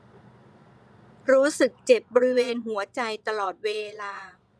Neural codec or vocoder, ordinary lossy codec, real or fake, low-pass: vocoder, 22.05 kHz, 80 mel bands, Vocos; none; fake; none